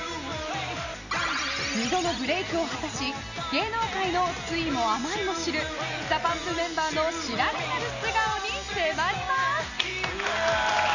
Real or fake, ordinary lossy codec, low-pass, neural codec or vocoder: real; none; 7.2 kHz; none